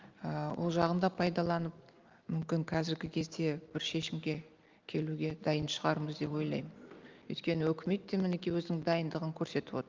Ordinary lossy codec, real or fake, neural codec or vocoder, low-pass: Opus, 32 kbps; real; none; 7.2 kHz